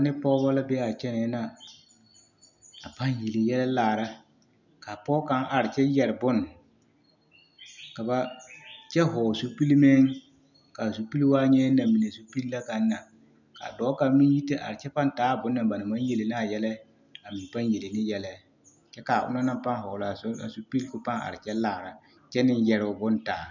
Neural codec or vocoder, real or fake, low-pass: none; real; 7.2 kHz